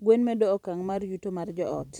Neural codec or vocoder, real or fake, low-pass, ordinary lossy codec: vocoder, 44.1 kHz, 128 mel bands every 512 samples, BigVGAN v2; fake; 19.8 kHz; none